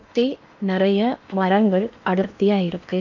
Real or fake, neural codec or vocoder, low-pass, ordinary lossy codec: fake; codec, 16 kHz in and 24 kHz out, 0.8 kbps, FocalCodec, streaming, 65536 codes; 7.2 kHz; none